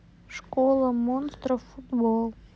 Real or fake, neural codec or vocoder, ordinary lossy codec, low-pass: real; none; none; none